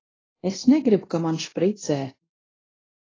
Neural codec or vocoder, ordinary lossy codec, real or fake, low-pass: codec, 24 kHz, 0.9 kbps, DualCodec; AAC, 32 kbps; fake; 7.2 kHz